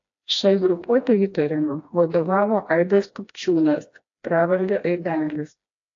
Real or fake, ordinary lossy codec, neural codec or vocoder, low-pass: fake; AAC, 48 kbps; codec, 16 kHz, 1 kbps, FreqCodec, smaller model; 7.2 kHz